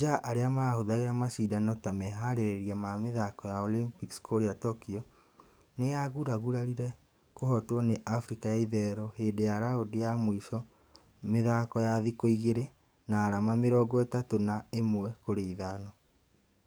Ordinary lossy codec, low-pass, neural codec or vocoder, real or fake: none; none; codec, 44.1 kHz, 7.8 kbps, DAC; fake